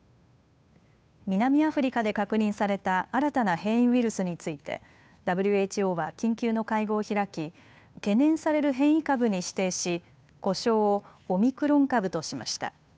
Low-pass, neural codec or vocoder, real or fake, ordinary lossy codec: none; codec, 16 kHz, 2 kbps, FunCodec, trained on Chinese and English, 25 frames a second; fake; none